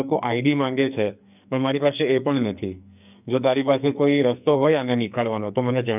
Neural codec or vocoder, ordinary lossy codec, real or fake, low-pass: codec, 44.1 kHz, 2.6 kbps, SNAC; none; fake; 3.6 kHz